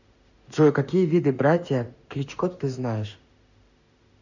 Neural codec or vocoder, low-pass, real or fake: autoencoder, 48 kHz, 32 numbers a frame, DAC-VAE, trained on Japanese speech; 7.2 kHz; fake